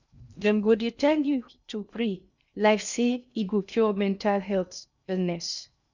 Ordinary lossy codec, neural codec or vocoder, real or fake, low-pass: none; codec, 16 kHz in and 24 kHz out, 0.6 kbps, FocalCodec, streaming, 2048 codes; fake; 7.2 kHz